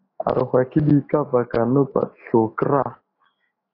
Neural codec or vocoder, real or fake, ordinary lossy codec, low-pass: autoencoder, 48 kHz, 128 numbers a frame, DAC-VAE, trained on Japanese speech; fake; AAC, 24 kbps; 5.4 kHz